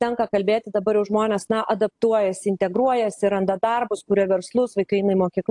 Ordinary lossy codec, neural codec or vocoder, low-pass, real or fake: AAC, 64 kbps; none; 10.8 kHz; real